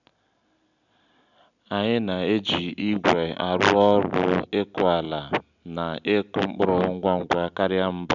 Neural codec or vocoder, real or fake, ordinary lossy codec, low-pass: none; real; none; 7.2 kHz